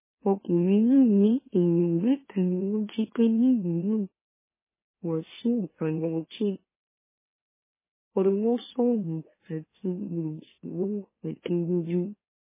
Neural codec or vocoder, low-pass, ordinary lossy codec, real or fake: autoencoder, 44.1 kHz, a latent of 192 numbers a frame, MeloTTS; 3.6 kHz; MP3, 16 kbps; fake